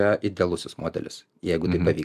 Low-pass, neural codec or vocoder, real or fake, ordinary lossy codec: 14.4 kHz; none; real; AAC, 96 kbps